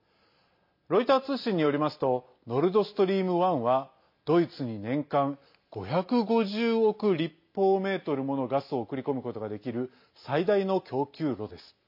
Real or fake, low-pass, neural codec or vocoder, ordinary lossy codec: real; 5.4 kHz; none; MP3, 32 kbps